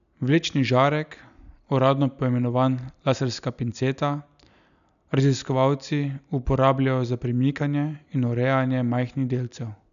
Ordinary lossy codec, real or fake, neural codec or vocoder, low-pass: none; real; none; 7.2 kHz